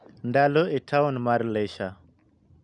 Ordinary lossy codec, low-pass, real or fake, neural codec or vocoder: none; 10.8 kHz; real; none